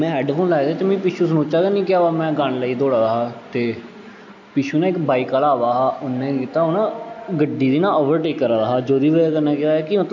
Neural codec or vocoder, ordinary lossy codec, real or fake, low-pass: none; none; real; 7.2 kHz